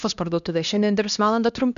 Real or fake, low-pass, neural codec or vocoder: fake; 7.2 kHz; codec, 16 kHz, 1 kbps, X-Codec, HuBERT features, trained on LibriSpeech